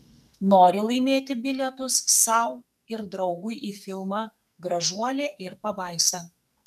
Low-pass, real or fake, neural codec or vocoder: 14.4 kHz; fake; codec, 44.1 kHz, 2.6 kbps, SNAC